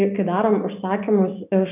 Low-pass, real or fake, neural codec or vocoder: 3.6 kHz; real; none